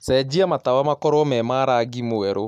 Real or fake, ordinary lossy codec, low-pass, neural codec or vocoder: real; none; 14.4 kHz; none